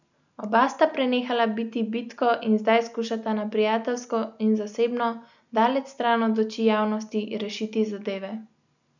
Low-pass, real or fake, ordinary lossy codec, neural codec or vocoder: 7.2 kHz; real; none; none